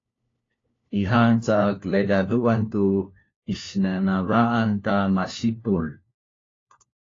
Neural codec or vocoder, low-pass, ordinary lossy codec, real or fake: codec, 16 kHz, 1 kbps, FunCodec, trained on LibriTTS, 50 frames a second; 7.2 kHz; AAC, 32 kbps; fake